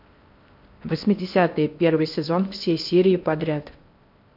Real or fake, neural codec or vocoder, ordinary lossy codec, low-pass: fake; codec, 16 kHz in and 24 kHz out, 0.6 kbps, FocalCodec, streaming, 4096 codes; MP3, 48 kbps; 5.4 kHz